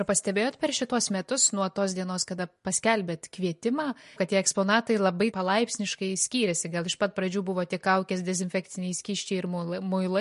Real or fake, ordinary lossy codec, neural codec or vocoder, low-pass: real; MP3, 48 kbps; none; 14.4 kHz